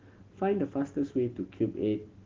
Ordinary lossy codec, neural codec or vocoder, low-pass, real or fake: Opus, 16 kbps; none; 7.2 kHz; real